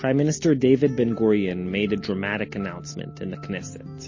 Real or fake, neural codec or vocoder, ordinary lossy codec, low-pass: real; none; MP3, 32 kbps; 7.2 kHz